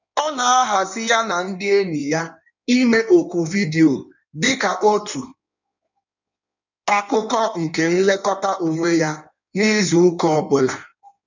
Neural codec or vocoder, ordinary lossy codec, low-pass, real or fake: codec, 16 kHz in and 24 kHz out, 1.1 kbps, FireRedTTS-2 codec; none; 7.2 kHz; fake